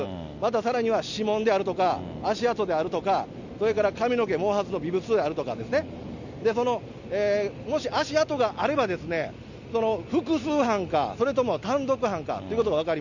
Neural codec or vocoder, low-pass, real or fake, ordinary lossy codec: none; 7.2 kHz; real; none